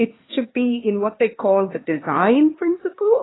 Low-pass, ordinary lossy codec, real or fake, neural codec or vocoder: 7.2 kHz; AAC, 16 kbps; fake; codec, 16 kHz, 2 kbps, X-Codec, HuBERT features, trained on LibriSpeech